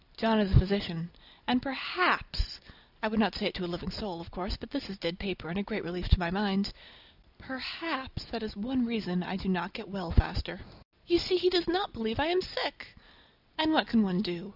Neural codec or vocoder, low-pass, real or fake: none; 5.4 kHz; real